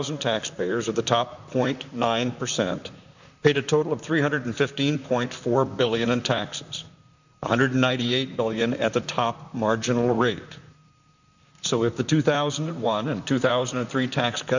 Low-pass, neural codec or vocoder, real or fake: 7.2 kHz; vocoder, 44.1 kHz, 128 mel bands, Pupu-Vocoder; fake